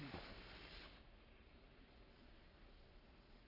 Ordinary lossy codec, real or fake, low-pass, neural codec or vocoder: none; fake; 5.4 kHz; codec, 44.1 kHz, 7.8 kbps, Pupu-Codec